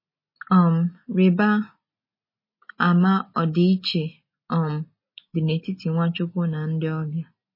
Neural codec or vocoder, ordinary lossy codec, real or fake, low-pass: none; MP3, 24 kbps; real; 5.4 kHz